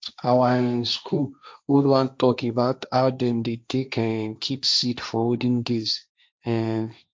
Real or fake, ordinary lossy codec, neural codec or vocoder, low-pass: fake; none; codec, 16 kHz, 1.1 kbps, Voila-Tokenizer; none